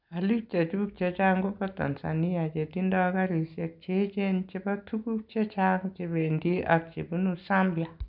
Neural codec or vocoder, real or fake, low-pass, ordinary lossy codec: none; real; 5.4 kHz; none